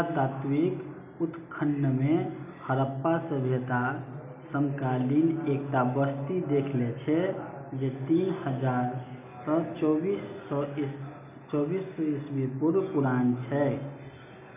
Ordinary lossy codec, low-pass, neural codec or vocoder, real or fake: none; 3.6 kHz; none; real